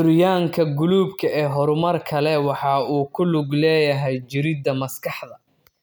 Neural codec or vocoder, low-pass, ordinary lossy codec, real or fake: none; none; none; real